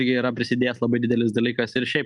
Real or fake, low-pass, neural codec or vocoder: real; 10.8 kHz; none